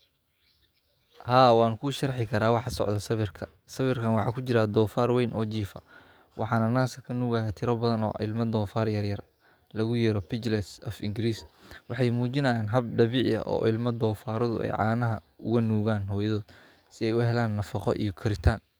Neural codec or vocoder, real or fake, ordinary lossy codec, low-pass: codec, 44.1 kHz, 7.8 kbps, DAC; fake; none; none